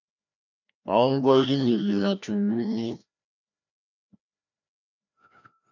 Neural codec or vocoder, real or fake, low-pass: codec, 16 kHz, 1 kbps, FreqCodec, larger model; fake; 7.2 kHz